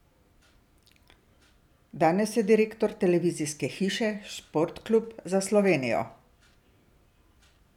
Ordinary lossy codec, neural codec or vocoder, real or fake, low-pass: none; none; real; 19.8 kHz